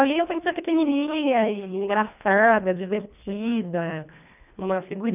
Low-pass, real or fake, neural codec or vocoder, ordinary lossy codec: 3.6 kHz; fake; codec, 24 kHz, 1.5 kbps, HILCodec; none